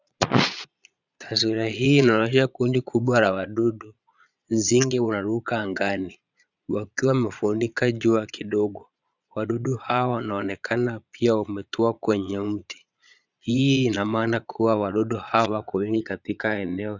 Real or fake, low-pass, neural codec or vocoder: fake; 7.2 kHz; vocoder, 22.05 kHz, 80 mel bands, Vocos